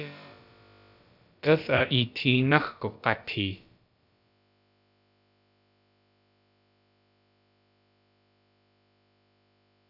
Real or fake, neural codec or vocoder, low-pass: fake; codec, 16 kHz, about 1 kbps, DyCAST, with the encoder's durations; 5.4 kHz